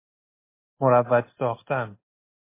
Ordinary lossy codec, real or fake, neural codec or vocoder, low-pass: MP3, 24 kbps; real; none; 3.6 kHz